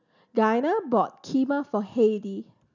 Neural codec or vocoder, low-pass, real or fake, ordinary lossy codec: none; 7.2 kHz; real; none